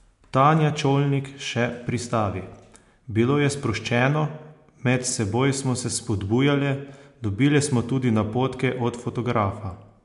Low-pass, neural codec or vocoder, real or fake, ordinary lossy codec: 10.8 kHz; none; real; MP3, 64 kbps